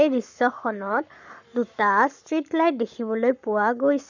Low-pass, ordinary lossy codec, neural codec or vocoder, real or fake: 7.2 kHz; none; codec, 44.1 kHz, 7.8 kbps, Pupu-Codec; fake